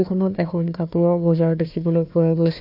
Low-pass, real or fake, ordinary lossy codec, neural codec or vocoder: 5.4 kHz; fake; AAC, 48 kbps; codec, 16 kHz, 1 kbps, FunCodec, trained on Chinese and English, 50 frames a second